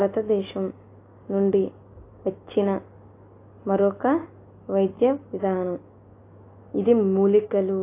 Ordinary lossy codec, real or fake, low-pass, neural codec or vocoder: AAC, 24 kbps; real; 3.6 kHz; none